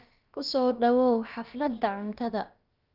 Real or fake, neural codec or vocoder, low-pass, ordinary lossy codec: fake; codec, 16 kHz, about 1 kbps, DyCAST, with the encoder's durations; 5.4 kHz; Opus, 24 kbps